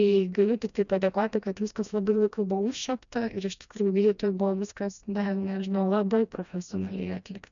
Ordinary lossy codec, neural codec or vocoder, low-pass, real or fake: MP3, 96 kbps; codec, 16 kHz, 1 kbps, FreqCodec, smaller model; 7.2 kHz; fake